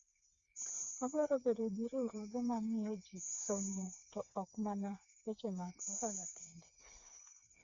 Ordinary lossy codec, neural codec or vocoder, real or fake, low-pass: Opus, 64 kbps; codec, 16 kHz, 4 kbps, FreqCodec, smaller model; fake; 7.2 kHz